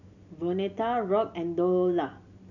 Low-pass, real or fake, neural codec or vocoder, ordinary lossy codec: 7.2 kHz; real; none; none